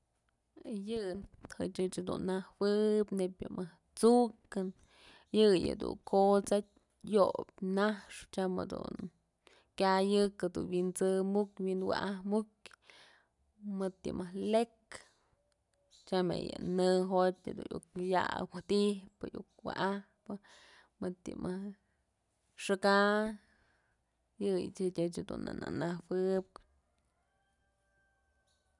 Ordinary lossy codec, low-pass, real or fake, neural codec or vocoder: none; 10.8 kHz; real; none